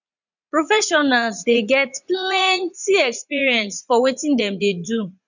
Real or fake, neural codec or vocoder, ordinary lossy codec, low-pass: fake; vocoder, 44.1 kHz, 80 mel bands, Vocos; none; 7.2 kHz